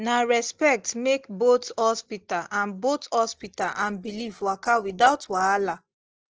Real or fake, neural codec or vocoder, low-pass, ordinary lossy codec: real; none; 7.2 kHz; Opus, 16 kbps